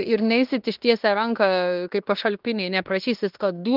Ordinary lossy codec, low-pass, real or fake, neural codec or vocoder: Opus, 32 kbps; 5.4 kHz; fake; codec, 16 kHz in and 24 kHz out, 0.9 kbps, LongCat-Audio-Codec, fine tuned four codebook decoder